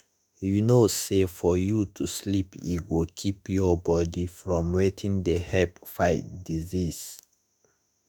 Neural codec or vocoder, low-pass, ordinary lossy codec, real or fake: autoencoder, 48 kHz, 32 numbers a frame, DAC-VAE, trained on Japanese speech; none; none; fake